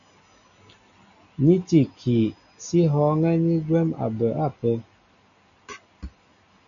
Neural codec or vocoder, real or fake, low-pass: none; real; 7.2 kHz